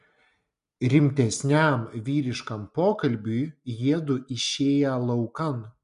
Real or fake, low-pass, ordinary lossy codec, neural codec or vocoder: real; 10.8 kHz; MP3, 64 kbps; none